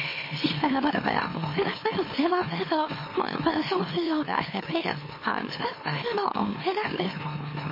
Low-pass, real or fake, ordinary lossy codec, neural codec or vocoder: 5.4 kHz; fake; MP3, 24 kbps; autoencoder, 44.1 kHz, a latent of 192 numbers a frame, MeloTTS